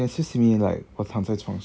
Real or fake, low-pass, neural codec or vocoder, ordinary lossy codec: real; none; none; none